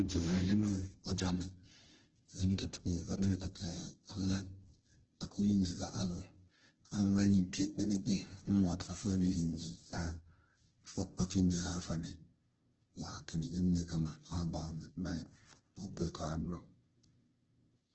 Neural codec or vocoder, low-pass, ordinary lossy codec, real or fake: codec, 16 kHz, 0.5 kbps, FunCodec, trained on Chinese and English, 25 frames a second; 7.2 kHz; Opus, 16 kbps; fake